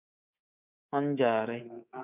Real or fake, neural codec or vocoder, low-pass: fake; codec, 24 kHz, 3.1 kbps, DualCodec; 3.6 kHz